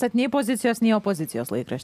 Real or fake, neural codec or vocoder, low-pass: real; none; 14.4 kHz